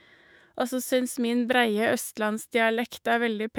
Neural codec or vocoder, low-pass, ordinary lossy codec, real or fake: autoencoder, 48 kHz, 128 numbers a frame, DAC-VAE, trained on Japanese speech; none; none; fake